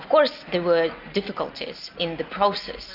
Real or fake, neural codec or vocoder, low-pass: real; none; 5.4 kHz